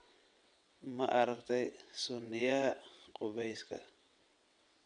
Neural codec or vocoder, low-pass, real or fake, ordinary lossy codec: vocoder, 22.05 kHz, 80 mel bands, WaveNeXt; 9.9 kHz; fake; none